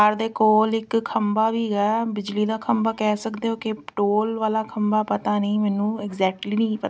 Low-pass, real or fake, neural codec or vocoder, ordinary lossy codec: none; real; none; none